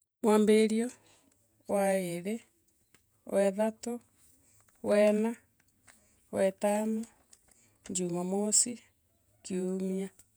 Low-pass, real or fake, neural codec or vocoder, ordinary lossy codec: none; fake; vocoder, 48 kHz, 128 mel bands, Vocos; none